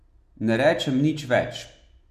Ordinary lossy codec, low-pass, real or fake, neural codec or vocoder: none; 14.4 kHz; real; none